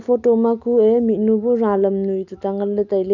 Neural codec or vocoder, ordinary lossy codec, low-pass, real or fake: none; none; 7.2 kHz; real